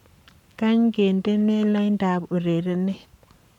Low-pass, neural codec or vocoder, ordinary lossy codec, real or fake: 19.8 kHz; codec, 44.1 kHz, 7.8 kbps, Pupu-Codec; none; fake